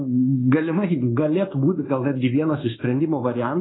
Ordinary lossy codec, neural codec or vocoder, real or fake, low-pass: AAC, 16 kbps; codec, 24 kHz, 1.2 kbps, DualCodec; fake; 7.2 kHz